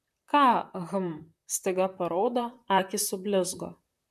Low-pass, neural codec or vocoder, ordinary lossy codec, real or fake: 14.4 kHz; vocoder, 44.1 kHz, 128 mel bands, Pupu-Vocoder; MP3, 96 kbps; fake